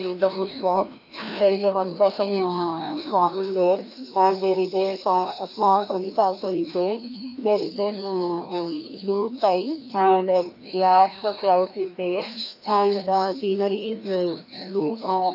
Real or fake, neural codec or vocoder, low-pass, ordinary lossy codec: fake; codec, 16 kHz, 1 kbps, FreqCodec, larger model; 5.4 kHz; AAC, 48 kbps